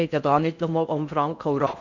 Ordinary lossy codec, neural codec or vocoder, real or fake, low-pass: none; codec, 16 kHz in and 24 kHz out, 0.6 kbps, FocalCodec, streaming, 4096 codes; fake; 7.2 kHz